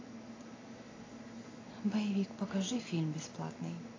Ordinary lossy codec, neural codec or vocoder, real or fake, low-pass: AAC, 32 kbps; none; real; 7.2 kHz